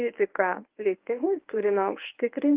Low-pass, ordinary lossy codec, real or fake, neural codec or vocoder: 3.6 kHz; Opus, 24 kbps; fake; codec, 24 kHz, 0.9 kbps, WavTokenizer, medium speech release version 1